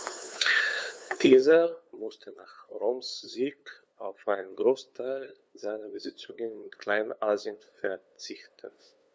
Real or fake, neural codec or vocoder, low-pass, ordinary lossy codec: fake; codec, 16 kHz, 2 kbps, FunCodec, trained on LibriTTS, 25 frames a second; none; none